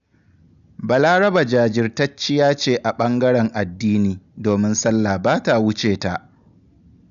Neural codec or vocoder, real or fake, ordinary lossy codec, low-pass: none; real; none; 7.2 kHz